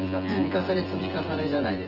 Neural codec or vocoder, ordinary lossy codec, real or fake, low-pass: vocoder, 24 kHz, 100 mel bands, Vocos; Opus, 16 kbps; fake; 5.4 kHz